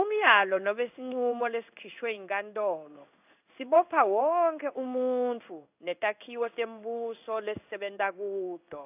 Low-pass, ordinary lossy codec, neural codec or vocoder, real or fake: 3.6 kHz; AAC, 32 kbps; codec, 16 kHz in and 24 kHz out, 1 kbps, XY-Tokenizer; fake